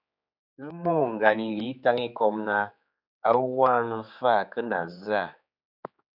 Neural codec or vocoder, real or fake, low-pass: codec, 16 kHz, 4 kbps, X-Codec, HuBERT features, trained on general audio; fake; 5.4 kHz